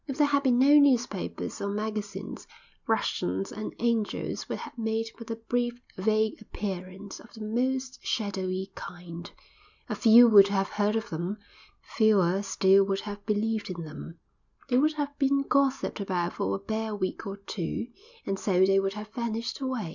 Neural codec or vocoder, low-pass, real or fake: none; 7.2 kHz; real